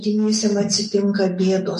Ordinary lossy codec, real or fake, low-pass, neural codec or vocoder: MP3, 48 kbps; real; 14.4 kHz; none